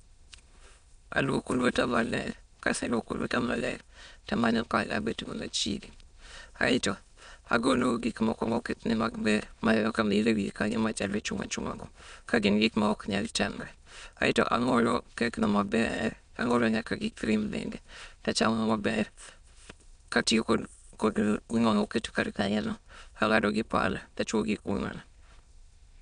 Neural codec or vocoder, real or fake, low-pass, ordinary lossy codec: autoencoder, 22.05 kHz, a latent of 192 numbers a frame, VITS, trained on many speakers; fake; 9.9 kHz; none